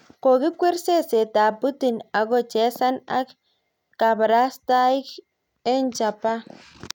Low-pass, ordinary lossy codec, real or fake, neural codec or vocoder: 19.8 kHz; none; real; none